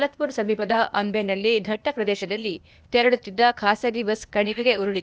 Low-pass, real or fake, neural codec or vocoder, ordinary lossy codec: none; fake; codec, 16 kHz, 0.8 kbps, ZipCodec; none